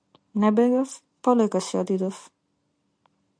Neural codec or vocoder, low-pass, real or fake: none; 9.9 kHz; real